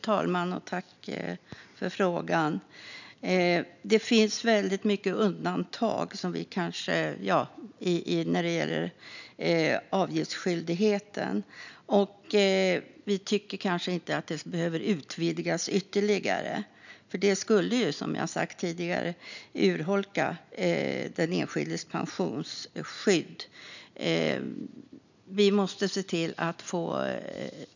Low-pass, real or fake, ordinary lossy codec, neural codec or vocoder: 7.2 kHz; real; none; none